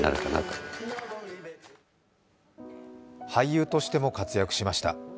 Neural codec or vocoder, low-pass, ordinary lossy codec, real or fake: none; none; none; real